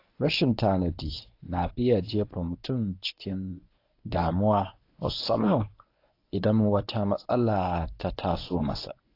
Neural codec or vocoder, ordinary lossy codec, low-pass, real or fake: codec, 24 kHz, 0.9 kbps, WavTokenizer, medium speech release version 1; AAC, 32 kbps; 5.4 kHz; fake